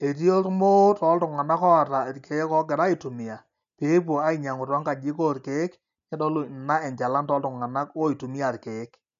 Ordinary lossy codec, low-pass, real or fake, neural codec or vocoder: none; 7.2 kHz; real; none